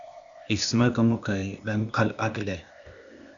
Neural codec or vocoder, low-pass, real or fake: codec, 16 kHz, 0.8 kbps, ZipCodec; 7.2 kHz; fake